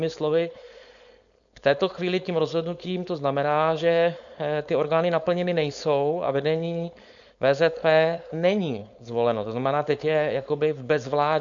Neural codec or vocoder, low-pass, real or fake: codec, 16 kHz, 4.8 kbps, FACodec; 7.2 kHz; fake